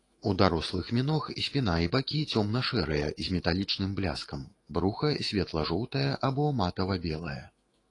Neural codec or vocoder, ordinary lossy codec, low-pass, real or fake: autoencoder, 48 kHz, 128 numbers a frame, DAC-VAE, trained on Japanese speech; AAC, 32 kbps; 10.8 kHz; fake